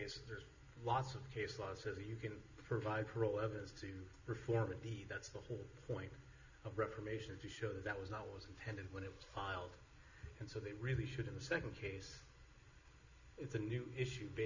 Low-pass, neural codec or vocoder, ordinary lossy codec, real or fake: 7.2 kHz; none; Opus, 64 kbps; real